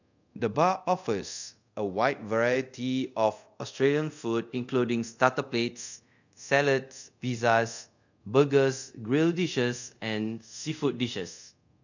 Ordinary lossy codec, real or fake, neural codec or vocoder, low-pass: none; fake; codec, 24 kHz, 0.5 kbps, DualCodec; 7.2 kHz